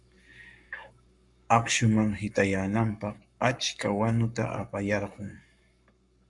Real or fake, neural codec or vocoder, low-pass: fake; codec, 44.1 kHz, 7.8 kbps, Pupu-Codec; 10.8 kHz